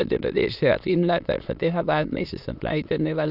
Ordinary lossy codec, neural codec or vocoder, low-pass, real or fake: none; autoencoder, 22.05 kHz, a latent of 192 numbers a frame, VITS, trained on many speakers; 5.4 kHz; fake